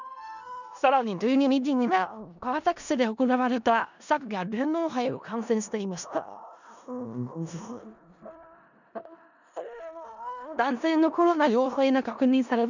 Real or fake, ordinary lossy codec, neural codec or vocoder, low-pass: fake; none; codec, 16 kHz in and 24 kHz out, 0.4 kbps, LongCat-Audio-Codec, four codebook decoder; 7.2 kHz